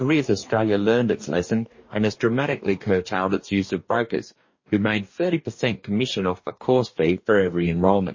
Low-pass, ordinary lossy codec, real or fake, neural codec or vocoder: 7.2 kHz; MP3, 32 kbps; fake; codec, 44.1 kHz, 2.6 kbps, DAC